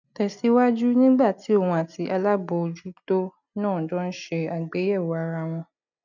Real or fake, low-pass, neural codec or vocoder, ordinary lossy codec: real; 7.2 kHz; none; none